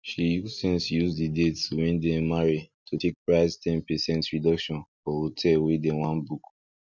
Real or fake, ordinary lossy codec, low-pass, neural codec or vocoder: real; none; 7.2 kHz; none